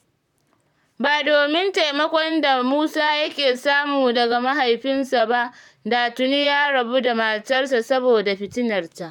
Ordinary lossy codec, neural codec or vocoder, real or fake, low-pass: none; vocoder, 44.1 kHz, 128 mel bands, Pupu-Vocoder; fake; 19.8 kHz